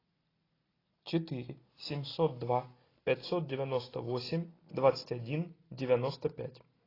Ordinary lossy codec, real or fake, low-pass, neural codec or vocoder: AAC, 24 kbps; real; 5.4 kHz; none